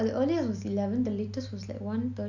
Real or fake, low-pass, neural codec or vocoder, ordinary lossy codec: real; 7.2 kHz; none; none